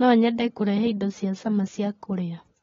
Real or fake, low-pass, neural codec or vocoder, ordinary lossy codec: real; 7.2 kHz; none; AAC, 24 kbps